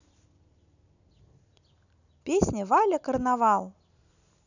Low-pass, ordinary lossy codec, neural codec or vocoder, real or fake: 7.2 kHz; none; none; real